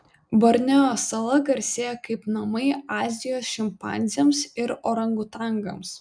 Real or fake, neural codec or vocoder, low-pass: real; none; 9.9 kHz